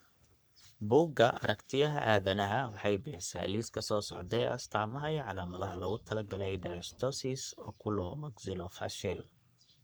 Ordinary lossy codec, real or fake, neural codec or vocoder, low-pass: none; fake; codec, 44.1 kHz, 3.4 kbps, Pupu-Codec; none